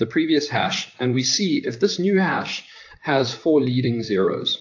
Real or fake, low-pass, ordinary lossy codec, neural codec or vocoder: fake; 7.2 kHz; AAC, 48 kbps; vocoder, 44.1 kHz, 128 mel bands, Pupu-Vocoder